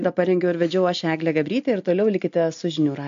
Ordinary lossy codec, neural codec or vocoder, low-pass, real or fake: MP3, 64 kbps; none; 7.2 kHz; real